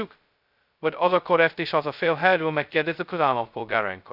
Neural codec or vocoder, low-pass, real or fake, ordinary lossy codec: codec, 16 kHz, 0.2 kbps, FocalCodec; 5.4 kHz; fake; Opus, 64 kbps